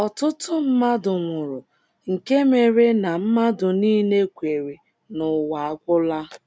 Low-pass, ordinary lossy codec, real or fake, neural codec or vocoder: none; none; real; none